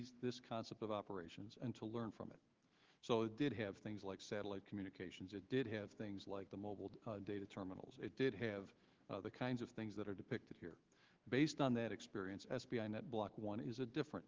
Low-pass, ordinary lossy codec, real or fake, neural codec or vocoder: 7.2 kHz; Opus, 16 kbps; fake; autoencoder, 48 kHz, 128 numbers a frame, DAC-VAE, trained on Japanese speech